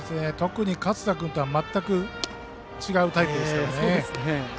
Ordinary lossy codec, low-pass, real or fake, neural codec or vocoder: none; none; real; none